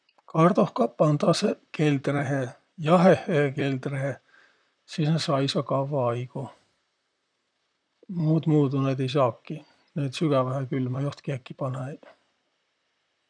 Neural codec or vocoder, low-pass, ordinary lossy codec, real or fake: vocoder, 44.1 kHz, 128 mel bands, Pupu-Vocoder; 9.9 kHz; none; fake